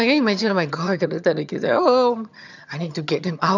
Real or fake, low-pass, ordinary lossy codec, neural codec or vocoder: fake; 7.2 kHz; none; vocoder, 22.05 kHz, 80 mel bands, HiFi-GAN